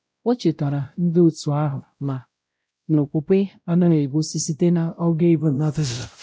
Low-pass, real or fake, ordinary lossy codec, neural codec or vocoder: none; fake; none; codec, 16 kHz, 0.5 kbps, X-Codec, WavLM features, trained on Multilingual LibriSpeech